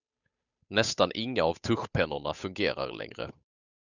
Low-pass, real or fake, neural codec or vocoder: 7.2 kHz; fake; codec, 16 kHz, 8 kbps, FunCodec, trained on Chinese and English, 25 frames a second